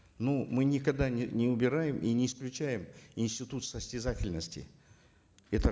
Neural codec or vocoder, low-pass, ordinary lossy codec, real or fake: none; none; none; real